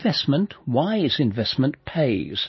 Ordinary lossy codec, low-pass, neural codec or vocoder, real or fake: MP3, 24 kbps; 7.2 kHz; none; real